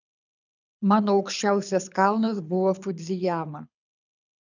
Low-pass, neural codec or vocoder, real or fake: 7.2 kHz; codec, 24 kHz, 6 kbps, HILCodec; fake